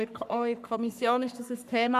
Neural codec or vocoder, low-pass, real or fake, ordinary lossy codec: codec, 44.1 kHz, 3.4 kbps, Pupu-Codec; 14.4 kHz; fake; none